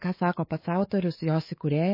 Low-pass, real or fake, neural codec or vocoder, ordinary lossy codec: 5.4 kHz; fake; codec, 24 kHz, 3.1 kbps, DualCodec; MP3, 24 kbps